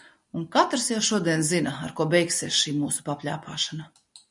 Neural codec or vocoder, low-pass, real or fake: none; 10.8 kHz; real